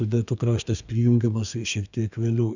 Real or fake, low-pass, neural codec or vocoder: fake; 7.2 kHz; codec, 32 kHz, 1.9 kbps, SNAC